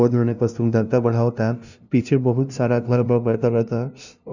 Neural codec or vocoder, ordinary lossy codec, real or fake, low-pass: codec, 16 kHz, 0.5 kbps, FunCodec, trained on LibriTTS, 25 frames a second; none; fake; 7.2 kHz